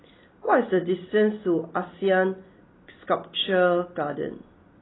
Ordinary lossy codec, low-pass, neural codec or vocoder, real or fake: AAC, 16 kbps; 7.2 kHz; none; real